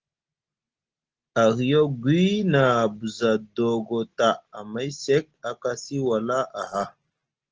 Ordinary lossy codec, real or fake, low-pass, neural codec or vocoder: Opus, 16 kbps; real; 7.2 kHz; none